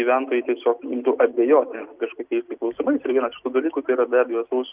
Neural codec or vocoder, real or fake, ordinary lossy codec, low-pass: none; real; Opus, 32 kbps; 3.6 kHz